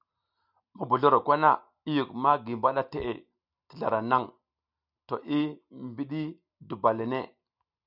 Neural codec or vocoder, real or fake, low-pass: none; real; 5.4 kHz